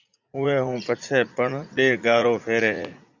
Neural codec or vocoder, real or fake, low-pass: vocoder, 44.1 kHz, 80 mel bands, Vocos; fake; 7.2 kHz